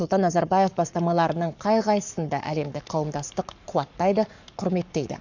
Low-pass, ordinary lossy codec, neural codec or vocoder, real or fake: 7.2 kHz; none; codec, 44.1 kHz, 7.8 kbps, Pupu-Codec; fake